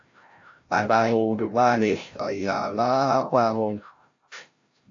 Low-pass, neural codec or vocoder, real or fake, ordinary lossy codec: 7.2 kHz; codec, 16 kHz, 0.5 kbps, FreqCodec, larger model; fake; AAC, 48 kbps